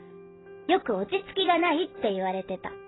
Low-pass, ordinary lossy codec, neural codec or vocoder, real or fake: 7.2 kHz; AAC, 16 kbps; none; real